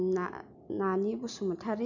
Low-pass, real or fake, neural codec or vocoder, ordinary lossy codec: 7.2 kHz; real; none; none